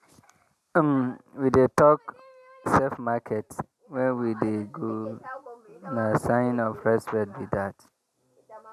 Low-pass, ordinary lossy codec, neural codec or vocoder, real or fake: 14.4 kHz; none; none; real